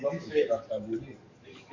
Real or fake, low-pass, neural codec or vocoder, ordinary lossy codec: fake; 7.2 kHz; codec, 44.1 kHz, 7.8 kbps, DAC; MP3, 48 kbps